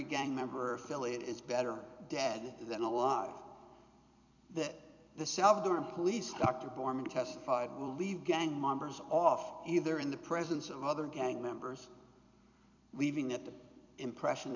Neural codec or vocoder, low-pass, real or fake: vocoder, 44.1 kHz, 128 mel bands every 256 samples, BigVGAN v2; 7.2 kHz; fake